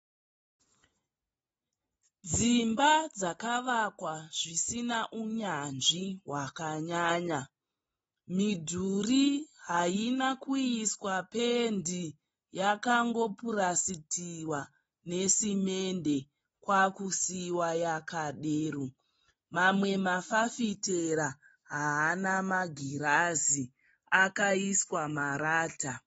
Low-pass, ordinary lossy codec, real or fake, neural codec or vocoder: 19.8 kHz; AAC, 24 kbps; real; none